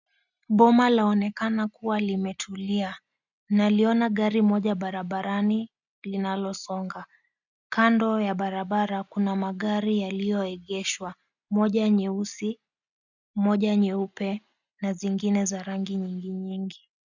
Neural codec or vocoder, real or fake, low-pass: none; real; 7.2 kHz